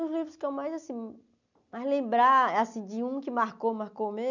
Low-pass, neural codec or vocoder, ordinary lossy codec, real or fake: 7.2 kHz; none; none; real